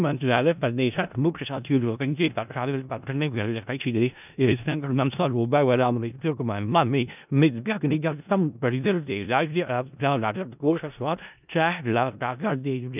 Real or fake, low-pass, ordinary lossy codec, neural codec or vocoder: fake; 3.6 kHz; none; codec, 16 kHz in and 24 kHz out, 0.4 kbps, LongCat-Audio-Codec, four codebook decoder